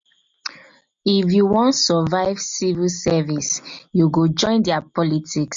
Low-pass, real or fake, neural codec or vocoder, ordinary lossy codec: 7.2 kHz; real; none; MP3, 48 kbps